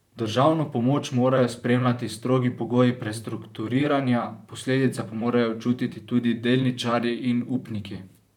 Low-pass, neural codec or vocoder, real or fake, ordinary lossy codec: 19.8 kHz; vocoder, 44.1 kHz, 128 mel bands, Pupu-Vocoder; fake; none